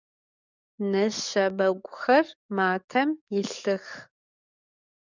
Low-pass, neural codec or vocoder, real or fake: 7.2 kHz; codec, 44.1 kHz, 7.8 kbps, DAC; fake